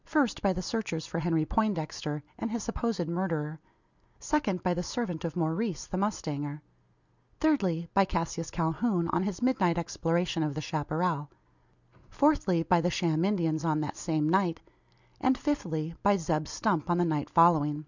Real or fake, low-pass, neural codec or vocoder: real; 7.2 kHz; none